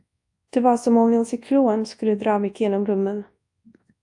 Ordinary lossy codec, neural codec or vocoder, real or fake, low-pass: AAC, 64 kbps; codec, 24 kHz, 0.9 kbps, WavTokenizer, large speech release; fake; 10.8 kHz